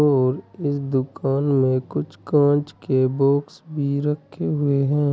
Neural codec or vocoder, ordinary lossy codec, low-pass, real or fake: none; none; none; real